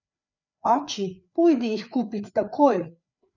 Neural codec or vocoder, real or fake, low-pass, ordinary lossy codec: codec, 16 kHz, 4 kbps, FreqCodec, larger model; fake; 7.2 kHz; none